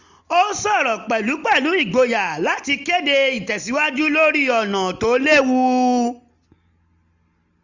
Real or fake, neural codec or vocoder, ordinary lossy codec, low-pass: real; none; none; 7.2 kHz